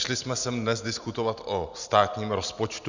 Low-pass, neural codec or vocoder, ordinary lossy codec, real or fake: 7.2 kHz; none; Opus, 64 kbps; real